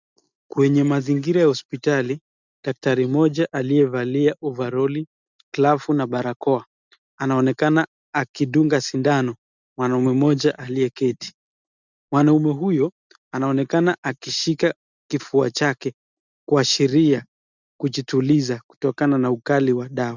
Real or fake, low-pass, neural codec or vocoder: real; 7.2 kHz; none